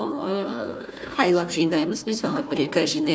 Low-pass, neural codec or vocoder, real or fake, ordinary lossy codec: none; codec, 16 kHz, 1 kbps, FunCodec, trained on Chinese and English, 50 frames a second; fake; none